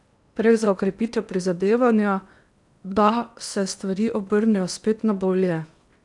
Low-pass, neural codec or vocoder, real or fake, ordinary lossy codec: 10.8 kHz; codec, 16 kHz in and 24 kHz out, 0.8 kbps, FocalCodec, streaming, 65536 codes; fake; none